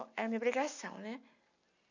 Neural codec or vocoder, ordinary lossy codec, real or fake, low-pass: none; none; real; 7.2 kHz